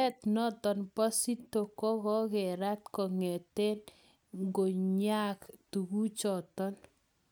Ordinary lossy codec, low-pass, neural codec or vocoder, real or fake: none; none; none; real